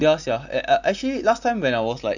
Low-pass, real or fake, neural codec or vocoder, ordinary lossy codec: 7.2 kHz; real; none; none